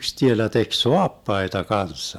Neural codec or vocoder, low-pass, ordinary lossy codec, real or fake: none; 19.8 kHz; MP3, 96 kbps; real